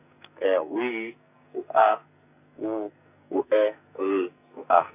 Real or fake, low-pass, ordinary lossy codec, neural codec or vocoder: fake; 3.6 kHz; none; codec, 44.1 kHz, 2.6 kbps, SNAC